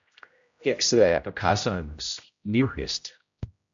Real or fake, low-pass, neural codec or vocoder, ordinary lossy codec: fake; 7.2 kHz; codec, 16 kHz, 0.5 kbps, X-Codec, HuBERT features, trained on general audio; MP3, 64 kbps